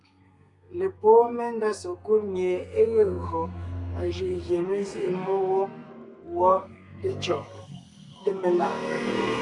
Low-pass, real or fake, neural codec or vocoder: 10.8 kHz; fake; codec, 32 kHz, 1.9 kbps, SNAC